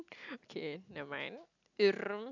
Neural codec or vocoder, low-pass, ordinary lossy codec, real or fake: none; 7.2 kHz; none; real